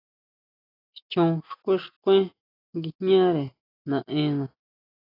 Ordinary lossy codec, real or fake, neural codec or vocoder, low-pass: AAC, 24 kbps; real; none; 5.4 kHz